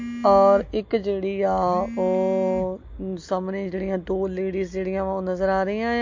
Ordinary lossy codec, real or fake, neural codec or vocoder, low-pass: MP3, 48 kbps; real; none; 7.2 kHz